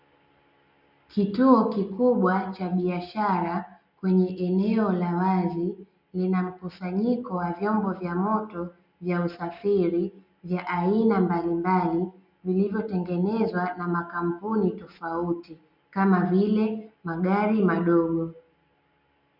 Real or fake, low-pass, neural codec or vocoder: real; 5.4 kHz; none